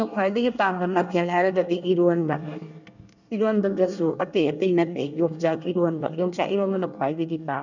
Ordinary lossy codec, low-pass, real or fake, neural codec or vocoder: none; 7.2 kHz; fake; codec, 24 kHz, 1 kbps, SNAC